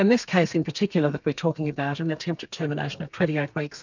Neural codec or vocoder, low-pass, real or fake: codec, 32 kHz, 1.9 kbps, SNAC; 7.2 kHz; fake